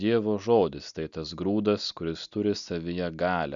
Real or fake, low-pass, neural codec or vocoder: real; 7.2 kHz; none